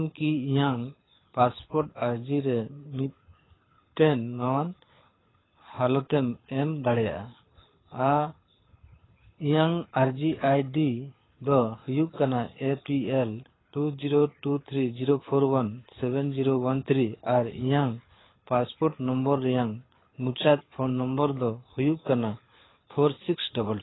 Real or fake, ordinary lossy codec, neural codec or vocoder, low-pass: fake; AAC, 16 kbps; codec, 24 kHz, 6 kbps, HILCodec; 7.2 kHz